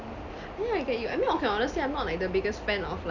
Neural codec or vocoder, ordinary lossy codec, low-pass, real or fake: none; none; 7.2 kHz; real